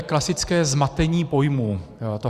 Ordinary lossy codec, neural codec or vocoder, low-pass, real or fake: Opus, 64 kbps; vocoder, 44.1 kHz, 128 mel bands every 512 samples, BigVGAN v2; 14.4 kHz; fake